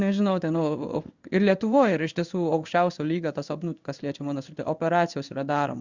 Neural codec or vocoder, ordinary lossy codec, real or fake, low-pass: codec, 16 kHz in and 24 kHz out, 1 kbps, XY-Tokenizer; Opus, 64 kbps; fake; 7.2 kHz